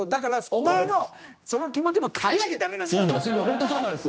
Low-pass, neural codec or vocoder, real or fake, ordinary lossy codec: none; codec, 16 kHz, 1 kbps, X-Codec, HuBERT features, trained on general audio; fake; none